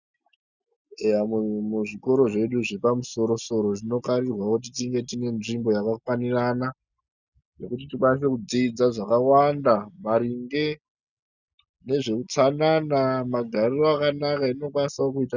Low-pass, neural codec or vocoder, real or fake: 7.2 kHz; none; real